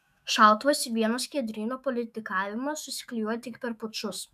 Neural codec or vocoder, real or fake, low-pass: autoencoder, 48 kHz, 128 numbers a frame, DAC-VAE, trained on Japanese speech; fake; 14.4 kHz